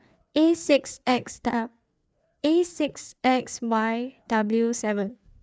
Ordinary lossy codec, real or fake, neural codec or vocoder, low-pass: none; fake; codec, 16 kHz, 4 kbps, FreqCodec, larger model; none